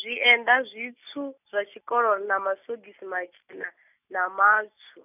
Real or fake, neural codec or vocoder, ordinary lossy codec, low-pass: real; none; none; 3.6 kHz